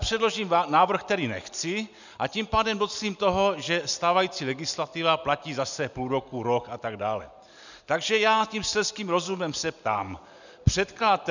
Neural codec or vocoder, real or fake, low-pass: none; real; 7.2 kHz